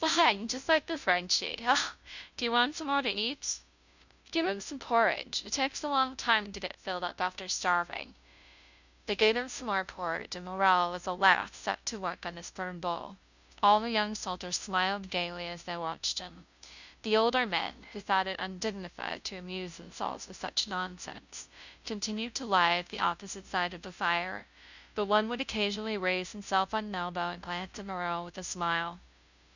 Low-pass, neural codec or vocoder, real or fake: 7.2 kHz; codec, 16 kHz, 0.5 kbps, FunCodec, trained on Chinese and English, 25 frames a second; fake